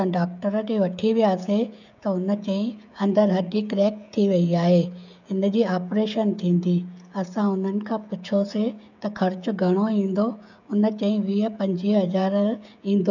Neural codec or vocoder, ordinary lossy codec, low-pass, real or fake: codec, 16 kHz, 16 kbps, FreqCodec, smaller model; none; 7.2 kHz; fake